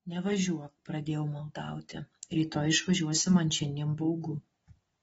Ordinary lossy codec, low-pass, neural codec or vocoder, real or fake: AAC, 24 kbps; 10.8 kHz; none; real